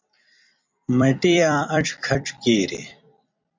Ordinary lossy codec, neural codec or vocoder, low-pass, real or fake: MP3, 64 kbps; vocoder, 44.1 kHz, 128 mel bands every 256 samples, BigVGAN v2; 7.2 kHz; fake